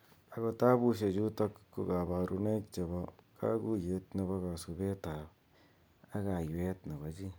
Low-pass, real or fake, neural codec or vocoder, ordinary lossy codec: none; real; none; none